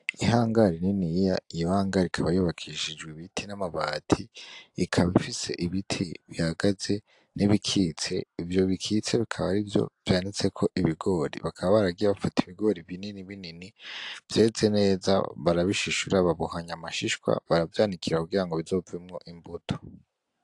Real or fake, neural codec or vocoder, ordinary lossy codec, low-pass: real; none; AAC, 64 kbps; 10.8 kHz